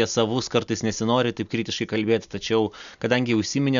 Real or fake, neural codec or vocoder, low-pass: real; none; 7.2 kHz